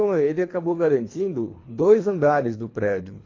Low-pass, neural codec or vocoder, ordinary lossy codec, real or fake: 7.2 kHz; codec, 24 kHz, 3 kbps, HILCodec; AAC, 32 kbps; fake